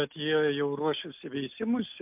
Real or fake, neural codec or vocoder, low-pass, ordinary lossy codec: real; none; 3.6 kHz; MP3, 32 kbps